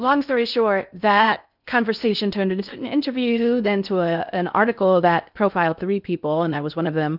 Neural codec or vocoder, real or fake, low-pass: codec, 16 kHz in and 24 kHz out, 0.6 kbps, FocalCodec, streaming, 4096 codes; fake; 5.4 kHz